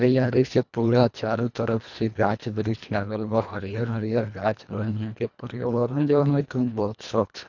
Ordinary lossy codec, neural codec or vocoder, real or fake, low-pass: none; codec, 24 kHz, 1.5 kbps, HILCodec; fake; 7.2 kHz